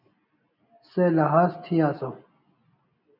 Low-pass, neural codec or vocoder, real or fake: 5.4 kHz; none; real